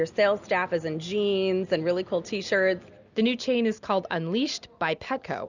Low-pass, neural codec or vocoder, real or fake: 7.2 kHz; none; real